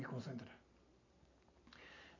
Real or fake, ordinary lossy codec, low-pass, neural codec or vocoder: real; AAC, 48 kbps; 7.2 kHz; none